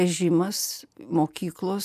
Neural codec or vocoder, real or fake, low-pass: none; real; 14.4 kHz